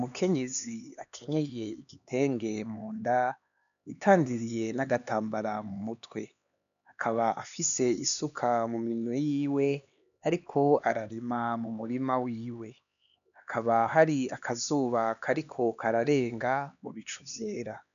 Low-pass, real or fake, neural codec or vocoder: 7.2 kHz; fake; codec, 16 kHz, 2 kbps, X-Codec, HuBERT features, trained on LibriSpeech